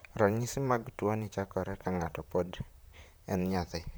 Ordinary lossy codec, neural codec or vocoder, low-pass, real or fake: none; vocoder, 44.1 kHz, 128 mel bands, Pupu-Vocoder; none; fake